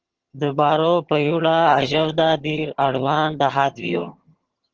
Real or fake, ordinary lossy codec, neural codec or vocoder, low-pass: fake; Opus, 16 kbps; vocoder, 22.05 kHz, 80 mel bands, HiFi-GAN; 7.2 kHz